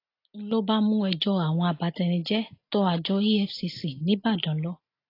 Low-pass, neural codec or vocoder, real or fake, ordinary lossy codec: 5.4 kHz; none; real; AAC, 32 kbps